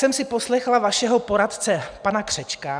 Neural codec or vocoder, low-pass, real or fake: none; 9.9 kHz; real